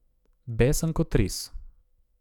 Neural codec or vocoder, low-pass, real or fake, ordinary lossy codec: autoencoder, 48 kHz, 128 numbers a frame, DAC-VAE, trained on Japanese speech; 19.8 kHz; fake; none